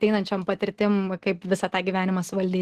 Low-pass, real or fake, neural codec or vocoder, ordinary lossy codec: 14.4 kHz; real; none; Opus, 16 kbps